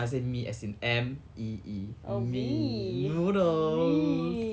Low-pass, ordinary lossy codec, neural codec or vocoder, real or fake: none; none; none; real